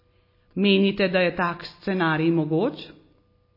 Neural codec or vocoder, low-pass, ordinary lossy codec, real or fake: none; 5.4 kHz; MP3, 24 kbps; real